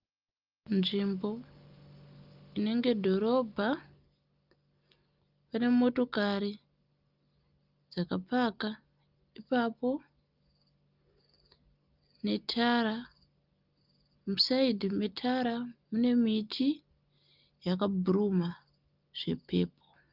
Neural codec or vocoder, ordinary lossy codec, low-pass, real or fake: none; Opus, 32 kbps; 5.4 kHz; real